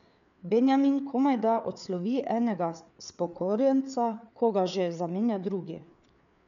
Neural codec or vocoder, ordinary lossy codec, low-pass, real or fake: codec, 16 kHz, 4 kbps, FreqCodec, larger model; none; 7.2 kHz; fake